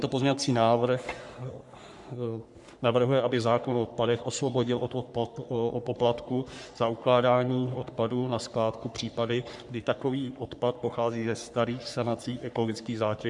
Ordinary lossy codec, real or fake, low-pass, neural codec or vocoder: MP3, 96 kbps; fake; 10.8 kHz; codec, 44.1 kHz, 3.4 kbps, Pupu-Codec